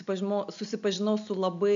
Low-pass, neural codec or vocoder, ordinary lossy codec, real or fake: 7.2 kHz; none; MP3, 64 kbps; real